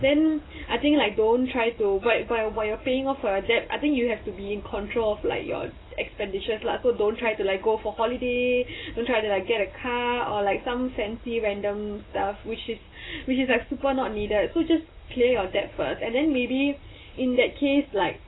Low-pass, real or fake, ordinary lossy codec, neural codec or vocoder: 7.2 kHz; real; AAC, 16 kbps; none